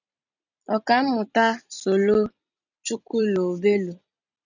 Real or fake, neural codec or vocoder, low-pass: fake; vocoder, 44.1 kHz, 128 mel bands every 256 samples, BigVGAN v2; 7.2 kHz